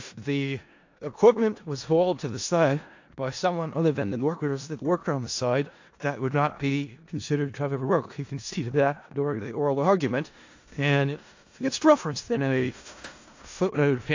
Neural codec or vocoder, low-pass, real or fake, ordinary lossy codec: codec, 16 kHz in and 24 kHz out, 0.4 kbps, LongCat-Audio-Codec, four codebook decoder; 7.2 kHz; fake; AAC, 48 kbps